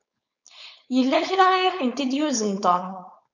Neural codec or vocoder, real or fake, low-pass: codec, 16 kHz, 4.8 kbps, FACodec; fake; 7.2 kHz